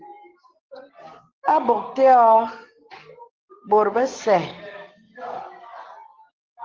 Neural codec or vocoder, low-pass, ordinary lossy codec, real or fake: none; 7.2 kHz; Opus, 16 kbps; real